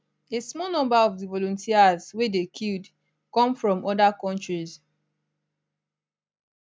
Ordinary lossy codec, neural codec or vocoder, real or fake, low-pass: none; none; real; none